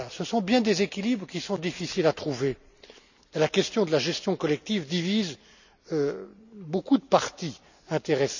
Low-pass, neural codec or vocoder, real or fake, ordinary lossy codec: 7.2 kHz; none; real; none